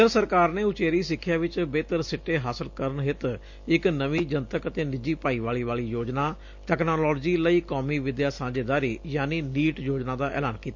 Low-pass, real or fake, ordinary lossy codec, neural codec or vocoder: 7.2 kHz; real; MP3, 48 kbps; none